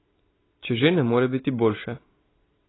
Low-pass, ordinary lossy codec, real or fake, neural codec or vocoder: 7.2 kHz; AAC, 16 kbps; real; none